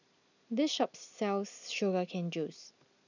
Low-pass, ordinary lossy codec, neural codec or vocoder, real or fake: 7.2 kHz; none; none; real